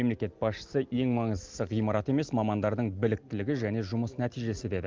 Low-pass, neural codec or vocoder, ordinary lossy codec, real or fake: 7.2 kHz; none; Opus, 24 kbps; real